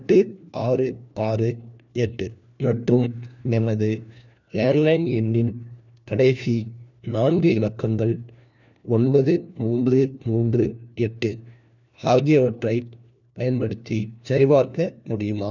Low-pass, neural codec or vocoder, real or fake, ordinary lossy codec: 7.2 kHz; codec, 16 kHz, 1 kbps, FunCodec, trained on LibriTTS, 50 frames a second; fake; none